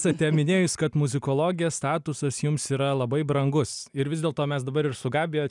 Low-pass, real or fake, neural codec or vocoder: 10.8 kHz; real; none